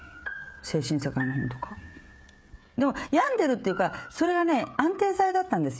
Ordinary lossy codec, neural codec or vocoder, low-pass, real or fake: none; codec, 16 kHz, 16 kbps, FreqCodec, smaller model; none; fake